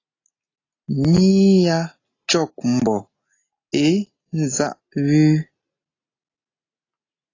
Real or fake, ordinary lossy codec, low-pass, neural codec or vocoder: real; AAC, 32 kbps; 7.2 kHz; none